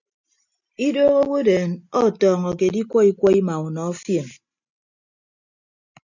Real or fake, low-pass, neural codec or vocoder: real; 7.2 kHz; none